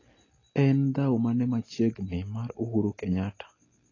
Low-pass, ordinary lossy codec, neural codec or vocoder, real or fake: 7.2 kHz; AAC, 32 kbps; none; real